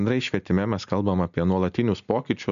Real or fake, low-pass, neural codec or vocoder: real; 7.2 kHz; none